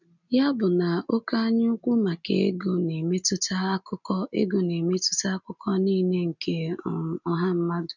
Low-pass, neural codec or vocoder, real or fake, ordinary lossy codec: 7.2 kHz; none; real; none